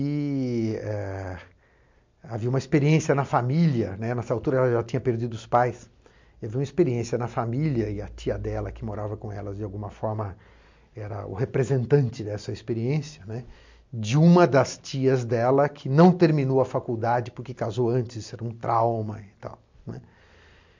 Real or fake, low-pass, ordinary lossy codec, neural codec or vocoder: real; 7.2 kHz; MP3, 64 kbps; none